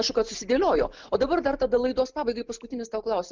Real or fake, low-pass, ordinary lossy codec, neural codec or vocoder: real; 7.2 kHz; Opus, 32 kbps; none